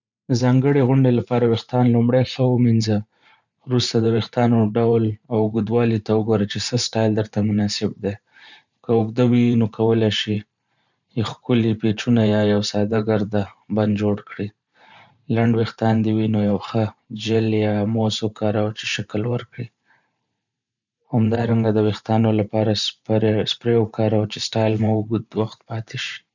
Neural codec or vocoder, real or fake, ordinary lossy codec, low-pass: vocoder, 44.1 kHz, 128 mel bands every 512 samples, BigVGAN v2; fake; none; 7.2 kHz